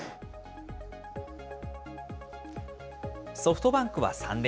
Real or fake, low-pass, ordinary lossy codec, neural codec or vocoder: real; none; none; none